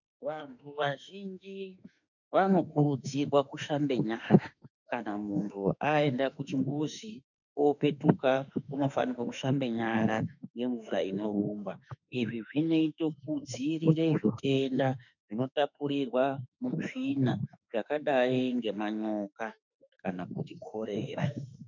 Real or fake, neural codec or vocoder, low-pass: fake; autoencoder, 48 kHz, 32 numbers a frame, DAC-VAE, trained on Japanese speech; 7.2 kHz